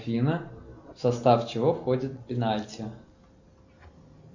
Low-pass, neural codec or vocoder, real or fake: 7.2 kHz; none; real